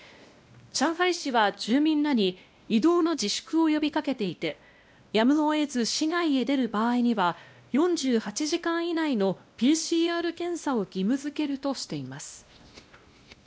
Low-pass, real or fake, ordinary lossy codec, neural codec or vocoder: none; fake; none; codec, 16 kHz, 1 kbps, X-Codec, WavLM features, trained on Multilingual LibriSpeech